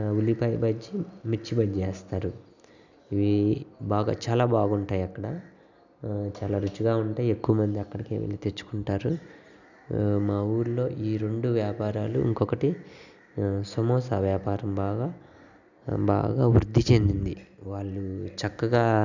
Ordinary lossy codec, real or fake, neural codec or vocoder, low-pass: none; real; none; 7.2 kHz